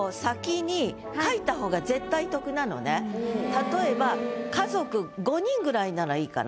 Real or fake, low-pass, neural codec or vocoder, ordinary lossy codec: real; none; none; none